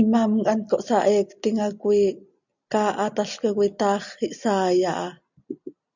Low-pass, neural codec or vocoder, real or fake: 7.2 kHz; none; real